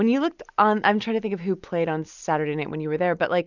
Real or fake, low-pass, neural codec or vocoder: real; 7.2 kHz; none